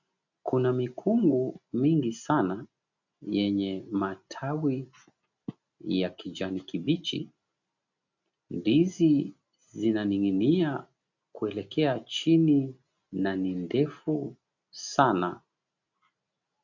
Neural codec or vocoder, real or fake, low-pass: none; real; 7.2 kHz